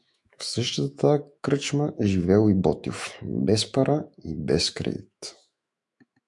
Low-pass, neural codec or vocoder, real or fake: 10.8 kHz; autoencoder, 48 kHz, 128 numbers a frame, DAC-VAE, trained on Japanese speech; fake